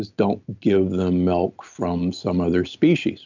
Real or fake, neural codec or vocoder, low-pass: real; none; 7.2 kHz